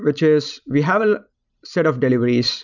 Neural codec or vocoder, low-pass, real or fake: none; 7.2 kHz; real